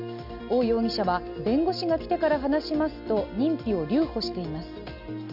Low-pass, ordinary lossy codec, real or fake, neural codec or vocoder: 5.4 kHz; none; real; none